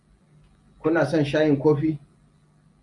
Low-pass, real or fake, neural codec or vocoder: 10.8 kHz; real; none